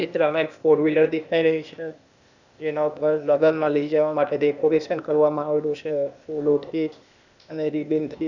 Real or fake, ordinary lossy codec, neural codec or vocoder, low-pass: fake; none; codec, 16 kHz, 0.8 kbps, ZipCodec; 7.2 kHz